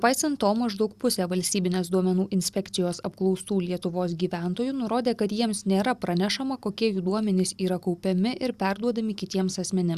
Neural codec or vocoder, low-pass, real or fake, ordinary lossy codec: none; 14.4 kHz; real; Opus, 64 kbps